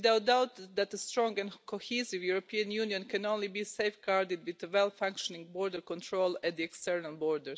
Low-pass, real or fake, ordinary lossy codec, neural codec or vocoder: none; real; none; none